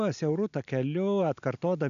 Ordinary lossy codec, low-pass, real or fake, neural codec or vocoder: MP3, 96 kbps; 7.2 kHz; real; none